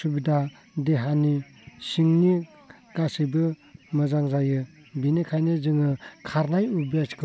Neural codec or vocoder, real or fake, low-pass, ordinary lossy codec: none; real; none; none